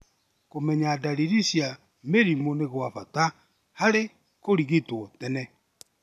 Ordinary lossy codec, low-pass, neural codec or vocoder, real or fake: none; 14.4 kHz; none; real